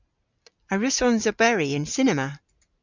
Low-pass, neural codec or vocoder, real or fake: 7.2 kHz; none; real